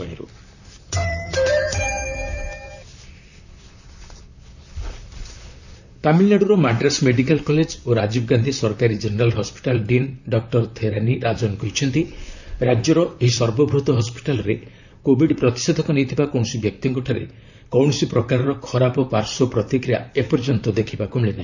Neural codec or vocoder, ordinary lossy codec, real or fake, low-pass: vocoder, 44.1 kHz, 128 mel bands, Pupu-Vocoder; none; fake; 7.2 kHz